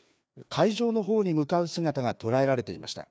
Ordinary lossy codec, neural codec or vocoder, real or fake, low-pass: none; codec, 16 kHz, 2 kbps, FreqCodec, larger model; fake; none